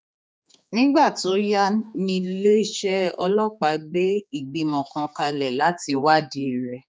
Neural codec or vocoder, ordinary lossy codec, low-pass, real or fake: codec, 16 kHz, 4 kbps, X-Codec, HuBERT features, trained on general audio; none; none; fake